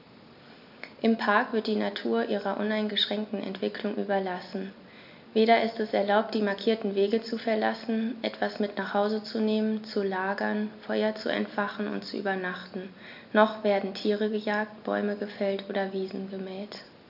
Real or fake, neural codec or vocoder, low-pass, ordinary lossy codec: real; none; 5.4 kHz; none